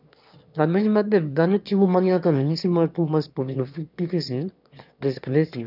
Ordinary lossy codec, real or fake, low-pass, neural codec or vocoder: none; fake; 5.4 kHz; autoencoder, 22.05 kHz, a latent of 192 numbers a frame, VITS, trained on one speaker